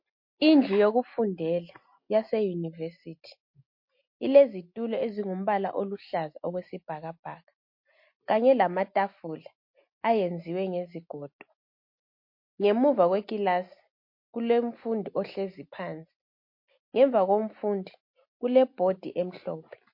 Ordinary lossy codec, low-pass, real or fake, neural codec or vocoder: MP3, 32 kbps; 5.4 kHz; real; none